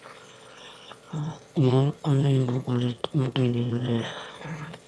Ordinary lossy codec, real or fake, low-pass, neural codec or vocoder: none; fake; none; autoencoder, 22.05 kHz, a latent of 192 numbers a frame, VITS, trained on one speaker